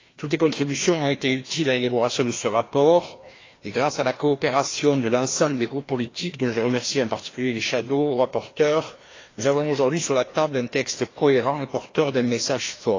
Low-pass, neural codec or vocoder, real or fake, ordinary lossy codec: 7.2 kHz; codec, 16 kHz, 1 kbps, FreqCodec, larger model; fake; AAC, 32 kbps